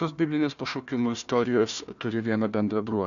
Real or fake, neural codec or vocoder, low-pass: fake; codec, 16 kHz, 1 kbps, FunCodec, trained on Chinese and English, 50 frames a second; 7.2 kHz